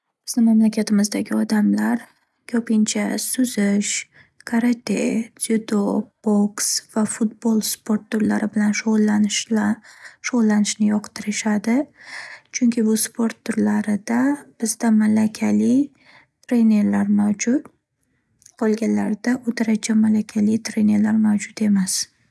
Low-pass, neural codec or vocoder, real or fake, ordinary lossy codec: none; none; real; none